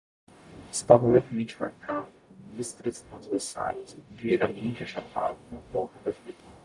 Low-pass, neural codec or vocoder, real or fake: 10.8 kHz; codec, 44.1 kHz, 0.9 kbps, DAC; fake